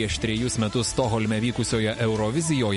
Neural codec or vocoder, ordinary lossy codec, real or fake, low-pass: none; MP3, 48 kbps; real; 10.8 kHz